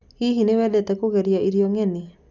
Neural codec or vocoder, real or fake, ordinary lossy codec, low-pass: none; real; none; 7.2 kHz